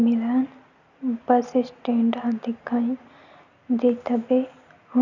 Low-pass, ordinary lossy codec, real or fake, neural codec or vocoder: 7.2 kHz; none; real; none